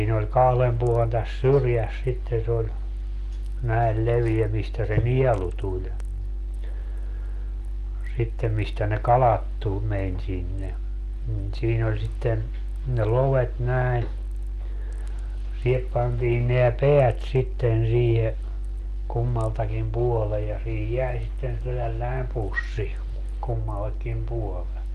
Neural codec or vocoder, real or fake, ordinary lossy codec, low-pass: none; real; Opus, 32 kbps; 14.4 kHz